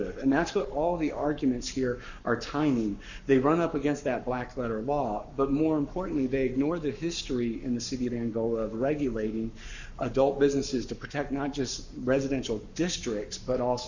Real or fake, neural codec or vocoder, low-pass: fake; codec, 44.1 kHz, 7.8 kbps, Pupu-Codec; 7.2 kHz